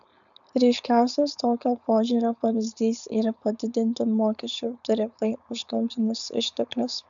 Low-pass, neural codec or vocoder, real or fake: 7.2 kHz; codec, 16 kHz, 4.8 kbps, FACodec; fake